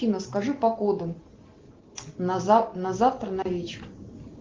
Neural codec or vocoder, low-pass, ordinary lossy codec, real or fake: none; 7.2 kHz; Opus, 16 kbps; real